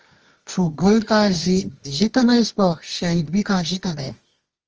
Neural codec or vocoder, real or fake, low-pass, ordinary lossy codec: codec, 24 kHz, 0.9 kbps, WavTokenizer, medium music audio release; fake; 7.2 kHz; Opus, 24 kbps